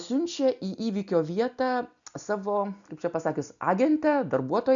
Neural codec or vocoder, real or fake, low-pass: none; real; 7.2 kHz